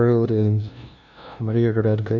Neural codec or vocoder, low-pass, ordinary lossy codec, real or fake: codec, 16 kHz, 0.5 kbps, FunCodec, trained on LibriTTS, 25 frames a second; 7.2 kHz; none; fake